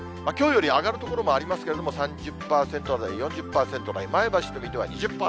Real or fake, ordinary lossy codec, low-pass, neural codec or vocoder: real; none; none; none